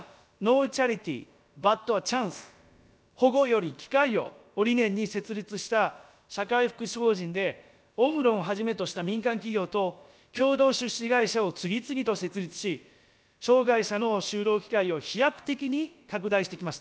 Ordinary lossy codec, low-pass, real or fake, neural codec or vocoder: none; none; fake; codec, 16 kHz, about 1 kbps, DyCAST, with the encoder's durations